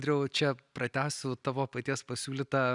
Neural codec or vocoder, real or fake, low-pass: none; real; 10.8 kHz